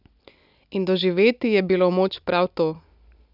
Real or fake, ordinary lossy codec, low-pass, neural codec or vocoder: real; none; 5.4 kHz; none